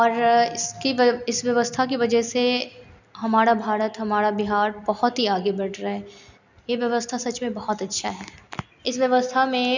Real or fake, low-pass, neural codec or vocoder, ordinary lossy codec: real; 7.2 kHz; none; none